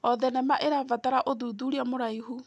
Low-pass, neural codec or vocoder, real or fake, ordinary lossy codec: none; none; real; none